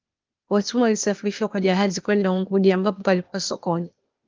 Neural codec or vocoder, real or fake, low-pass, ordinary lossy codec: codec, 16 kHz, 0.8 kbps, ZipCodec; fake; 7.2 kHz; Opus, 24 kbps